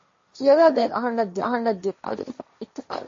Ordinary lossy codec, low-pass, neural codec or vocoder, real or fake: MP3, 32 kbps; 7.2 kHz; codec, 16 kHz, 1.1 kbps, Voila-Tokenizer; fake